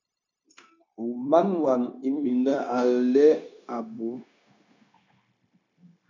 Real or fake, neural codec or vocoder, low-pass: fake; codec, 16 kHz, 0.9 kbps, LongCat-Audio-Codec; 7.2 kHz